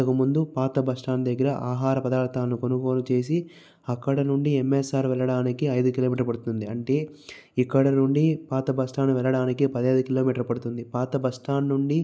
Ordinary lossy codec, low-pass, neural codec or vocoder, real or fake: none; none; none; real